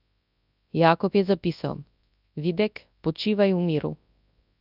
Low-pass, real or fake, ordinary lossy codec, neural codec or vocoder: 5.4 kHz; fake; none; codec, 24 kHz, 0.9 kbps, WavTokenizer, large speech release